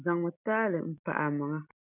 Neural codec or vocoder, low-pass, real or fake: none; 3.6 kHz; real